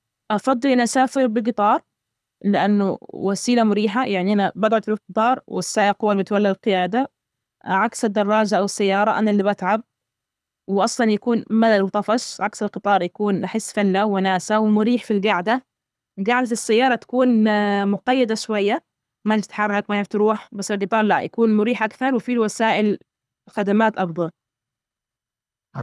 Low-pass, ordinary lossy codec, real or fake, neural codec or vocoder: none; none; fake; codec, 24 kHz, 6 kbps, HILCodec